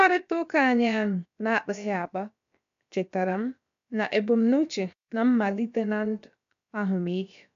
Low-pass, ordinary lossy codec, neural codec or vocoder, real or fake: 7.2 kHz; MP3, 64 kbps; codec, 16 kHz, about 1 kbps, DyCAST, with the encoder's durations; fake